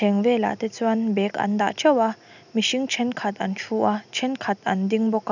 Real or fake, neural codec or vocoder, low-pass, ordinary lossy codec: real; none; 7.2 kHz; none